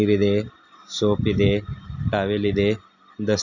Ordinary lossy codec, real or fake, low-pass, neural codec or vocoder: none; real; 7.2 kHz; none